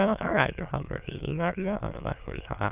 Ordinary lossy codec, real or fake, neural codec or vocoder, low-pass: Opus, 24 kbps; fake; autoencoder, 22.05 kHz, a latent of 192 numbers a frame, VITS, trained on many speakers; 3.6 kHz